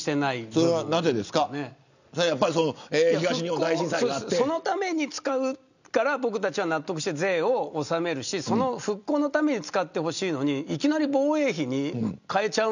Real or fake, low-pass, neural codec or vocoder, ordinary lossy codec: real; 7.2 kHz; none; none